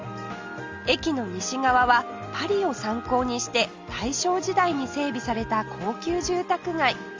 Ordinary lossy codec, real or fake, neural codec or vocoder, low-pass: Opus, 32 kbps; real; none; 7.2 kHz